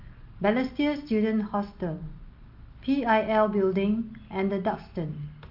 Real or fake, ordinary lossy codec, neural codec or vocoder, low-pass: real; Opus, 24 kbps; none; 5.4 kHz